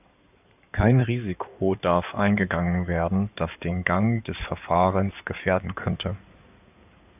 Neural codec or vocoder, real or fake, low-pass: codec, 16 kHz in and 24 kHz out, 2.2 kbps, FireRedTTS-2 codec; fake; 3.6 kHz